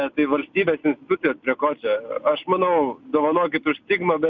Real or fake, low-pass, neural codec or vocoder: real; 7.2 kHz; none